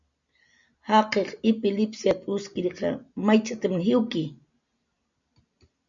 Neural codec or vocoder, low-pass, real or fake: none; 7.2 kHz; real